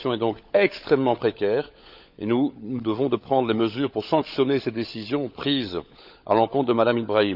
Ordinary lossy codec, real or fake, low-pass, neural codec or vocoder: none; fake; 5.4 kHz; codec, 16 kHz, 16 kbps, FunCodec, trained on LibriTTS, 50 frames a second